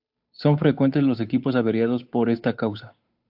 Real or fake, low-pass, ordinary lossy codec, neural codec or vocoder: fake; 5.4 kHz; AAC, 48 kbps; codec, 16 kHz, 8 kbps, FunCodec, trained on Chinese and English, 25 frames a second